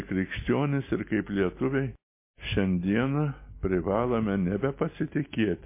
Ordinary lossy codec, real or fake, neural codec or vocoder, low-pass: MP3, 24 kbps; real; none; 3.6 kHz